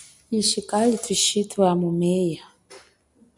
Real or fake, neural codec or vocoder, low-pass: real; none; 10.8 kHz